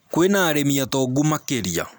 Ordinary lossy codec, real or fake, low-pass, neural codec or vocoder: none; real; none; none